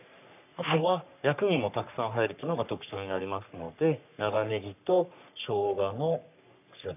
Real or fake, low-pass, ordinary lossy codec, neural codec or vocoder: fake; 3.6 kHz; none; codec, 44.1 kHz, 3.4 kbps, Pupu-Codec